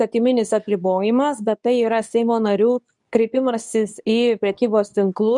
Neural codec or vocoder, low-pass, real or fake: codec, 24 kHz, 0.9 kbps, WavTokenizer, medium speech release version 2; 10.8 kHz; fake